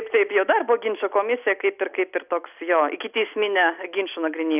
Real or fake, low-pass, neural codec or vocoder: real; 3.6 kHz; none